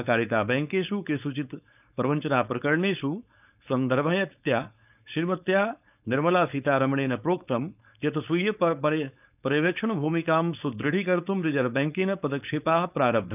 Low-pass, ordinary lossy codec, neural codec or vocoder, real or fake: 3.6 kHz; none; codec, 16 kHz, 4.8 kbps, FACodec; fake